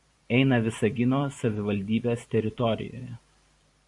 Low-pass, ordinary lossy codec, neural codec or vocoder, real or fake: 10.8 kHz; AAC, 64 kbps; vocoder, 44.1 kHz, 128 mel bands every 512 samples, BigVGAN v2; fake